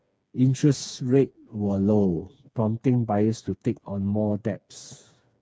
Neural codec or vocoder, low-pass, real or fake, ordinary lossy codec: codec, 16 kHz, 4 kbps, FreqCodec, smaller model; none; fake; none